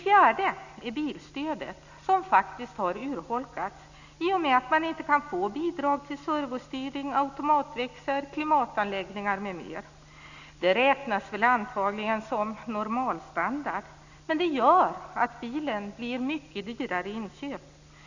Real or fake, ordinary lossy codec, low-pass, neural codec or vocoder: real; none; 7.2 kHz; none